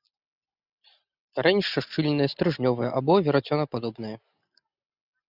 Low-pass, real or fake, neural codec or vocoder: 5.4 kHz; real; none